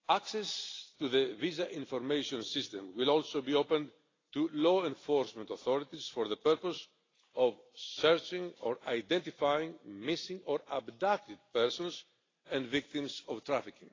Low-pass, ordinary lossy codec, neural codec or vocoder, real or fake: 7.2 kHz; AAC, 32 kbps; none; real